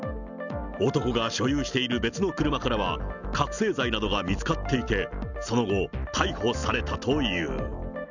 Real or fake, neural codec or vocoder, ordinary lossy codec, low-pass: real; none; none; 7.2 kHz